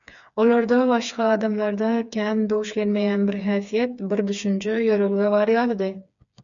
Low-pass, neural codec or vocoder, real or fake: 7.2 kHz; codec, 16 kHz, 4 kbps, FreqCodec, smaller model; fake